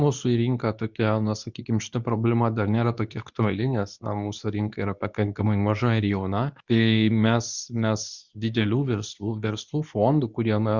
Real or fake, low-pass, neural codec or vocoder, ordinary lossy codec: fake; 7.2 kHz; codec, 24 kHz, 0.9 kbps, WavTokenizer, medium speech release version 2; Opus, 64 kbps